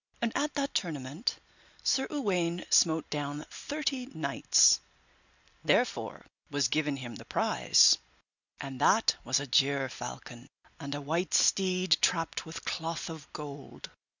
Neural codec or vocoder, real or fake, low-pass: none; real; 7.2 kHz